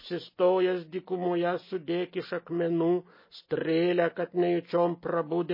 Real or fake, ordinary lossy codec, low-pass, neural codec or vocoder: real; MP3, 24 kbps; 5.4 kHz; none